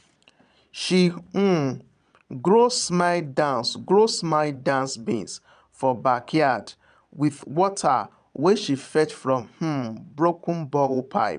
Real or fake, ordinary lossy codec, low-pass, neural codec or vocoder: fake; none; 9.9 kHz; vocoder, 22.05 kHz, 80 mel bands, Vocos